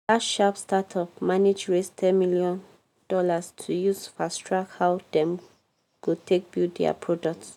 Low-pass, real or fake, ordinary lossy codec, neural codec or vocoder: 19.8 kHz; real; none; none